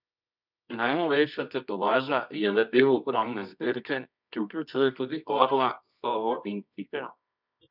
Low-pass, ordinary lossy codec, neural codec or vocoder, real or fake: 5.4 kHz; none; codec, 24 kHz, 0.9 kbps, WavTokenizer, medium music audio release; fake